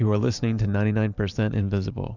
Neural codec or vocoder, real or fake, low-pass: vocoder, 44.1 kHz, 128 mel bands every 512 samples, BigVGAN v2; fake; 7.2 kHz